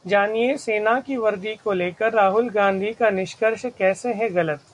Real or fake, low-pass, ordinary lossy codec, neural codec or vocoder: real; 10.8 kHz; MP3, 64 kbps; none